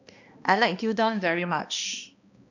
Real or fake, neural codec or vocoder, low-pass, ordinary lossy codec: fake; codec, 16 kHz, 1 kbps, X-Codec, HuBERT features, trained on balanced general audio; 7.2 kHz; none